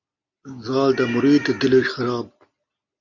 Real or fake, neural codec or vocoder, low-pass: real; none; 7.2 kHz